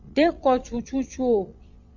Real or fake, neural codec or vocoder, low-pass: fake; vocoder, 22.05 kHz, 80 mel bands, Vocos; 7.2 kHz